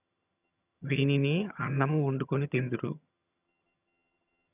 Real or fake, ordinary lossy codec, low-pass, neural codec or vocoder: fake; none; 3.6 kHz; vocoder, 22.05 kHz, 80 mel bands, HiFi-GAN